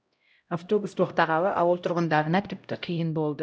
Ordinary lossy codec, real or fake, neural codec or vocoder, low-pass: none; fake; codec, 16 kHz, 0.5 kbps, X-Codec, HuBERT features, trained on LibriSpeech; none